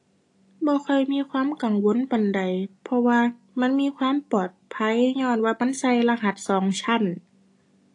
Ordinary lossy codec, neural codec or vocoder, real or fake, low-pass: AAC, 48 kbps; none; real; 10.8 kHz